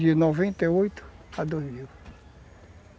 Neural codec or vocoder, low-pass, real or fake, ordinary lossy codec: none; none; real; none